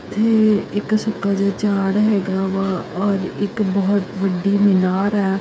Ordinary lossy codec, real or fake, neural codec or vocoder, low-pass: none; fake; codec, 16 kHz, 8 kbps, FreqCodec, smaller model; none